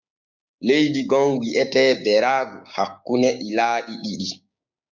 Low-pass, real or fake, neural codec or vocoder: 7.2 kHz; fake; codec, 16 kHz, 6 kbps, DAC